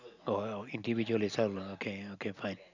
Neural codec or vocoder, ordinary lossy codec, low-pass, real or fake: none; none; 7.2 kHz; real